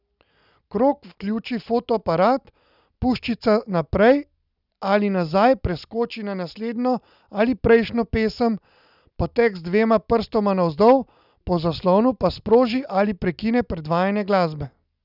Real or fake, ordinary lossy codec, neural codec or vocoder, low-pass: real; none; none; 5.4 kHz